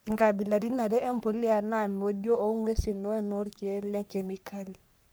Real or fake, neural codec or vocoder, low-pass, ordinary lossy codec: fake; codec, 44.1 kHz, 3.4 kbps, Pupu-Codec; none; none